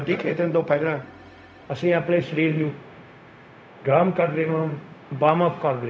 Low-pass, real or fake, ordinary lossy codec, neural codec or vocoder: none; fake; none; codec, 16 kHz, 0.4 kbps, LongCat-Audio-Codec